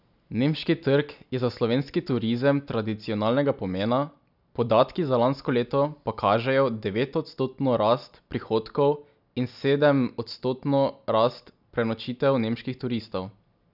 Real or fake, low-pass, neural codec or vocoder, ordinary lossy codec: real; 5.4 kHz; none; none